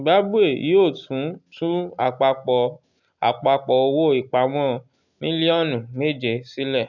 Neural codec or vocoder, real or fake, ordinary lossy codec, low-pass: none; real; none; 7.2 kHz